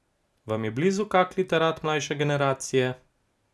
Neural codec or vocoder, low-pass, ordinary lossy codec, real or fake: none; none; none; real